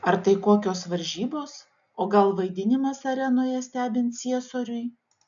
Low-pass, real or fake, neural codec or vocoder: 7.2 kHz; real; none